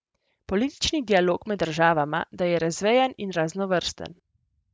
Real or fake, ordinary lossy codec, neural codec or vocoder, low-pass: real; none; none; none